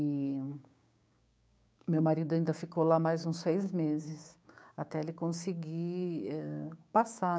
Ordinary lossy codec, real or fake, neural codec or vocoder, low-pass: none; fake; codec, 16 kHz, 6 kbps, DAC; none